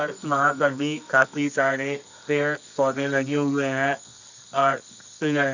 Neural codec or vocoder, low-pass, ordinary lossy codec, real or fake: codec, 24 kHz, 0.9 kbps, WavTokenizer, medium music audio release; 7.2 kHz; none; fake